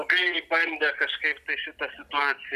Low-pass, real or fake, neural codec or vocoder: 14.4 kHz; fake; vocoder, 44.1 kHz, 128 mel bands every 256 samples, BigVGAN v2